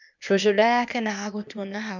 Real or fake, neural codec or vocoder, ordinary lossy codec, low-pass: fake; codec, 16 kHz, 0.8 kbps, ZipCodec; none; 7.2 kHz